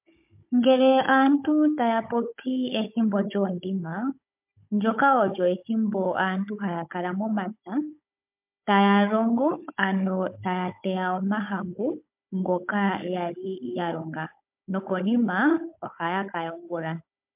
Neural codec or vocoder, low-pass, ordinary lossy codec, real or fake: codec, 16 kHz, 16 kbps, FunCodec, trained on Chinese and English, 50 frames a second; 3.6 kHz; MP3, 32 kbps; fake